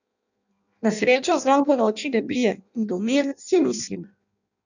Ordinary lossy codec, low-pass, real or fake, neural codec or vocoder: none; 7.2 kHz; fake; codec, 16 kHz in and 24 kHz out, 0.6 kbps, FireRedTTS-2 codec